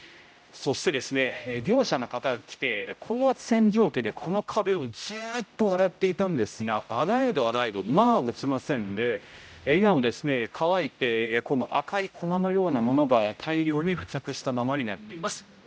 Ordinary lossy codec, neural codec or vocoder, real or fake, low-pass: none; codec, 16 kHz, 0.5 kbps, X-Codec, HuBERT features, trained on general audio; fake; none